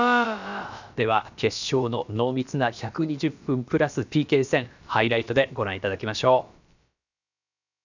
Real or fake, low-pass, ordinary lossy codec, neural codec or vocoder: fake; 7.2 kHz; none; codec, 16 kHz, about 1 kbps, DyCAST, with the encoder's durations